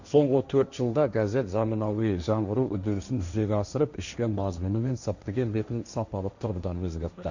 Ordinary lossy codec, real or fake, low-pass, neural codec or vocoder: none; fake; 7.2 kHz; codec, 16 kHz, 1.1 kbps, Voila-Tokenizer